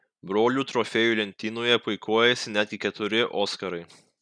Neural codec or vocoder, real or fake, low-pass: none; real; 9.9 kHz